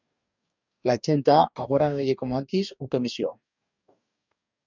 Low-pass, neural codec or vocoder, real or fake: 7.2 kHz; codec, 44.1 kHz, 2.6 kbps, DAC; fake